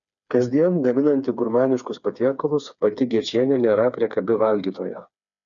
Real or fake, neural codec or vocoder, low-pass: fake; codec, 16 kHz, 4 kbps, FreqCodec, smaller model; 7.2 kHz